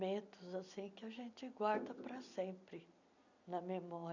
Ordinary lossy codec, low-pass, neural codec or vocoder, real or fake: none; 7.2 kHz; none; real